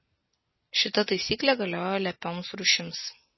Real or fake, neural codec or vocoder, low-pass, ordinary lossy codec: real; none; 7.2 kHz; MP3, 24 kbps